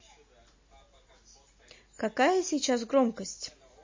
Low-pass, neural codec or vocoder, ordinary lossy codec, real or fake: 7.2 kHz; none; MP3, 32 kbps; real